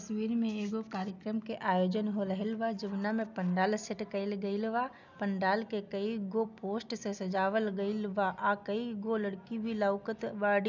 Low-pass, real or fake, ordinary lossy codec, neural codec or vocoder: 7.2 kHz; real; none; none